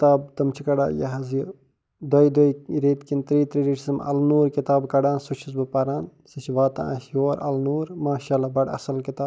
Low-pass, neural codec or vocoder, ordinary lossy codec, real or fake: none; none; none; real